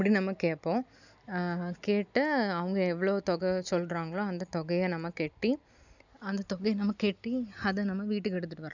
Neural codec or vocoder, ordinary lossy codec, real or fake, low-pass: none; none; real; 7.2 kHz